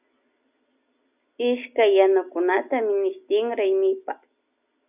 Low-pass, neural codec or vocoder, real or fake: 3.6 kHz; none; real